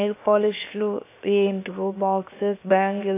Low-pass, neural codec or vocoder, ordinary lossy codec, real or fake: 3.6 kHz; codec, 16 kHz, 0.7 kbps, FocalCodec; AAC, 24 kbps; fake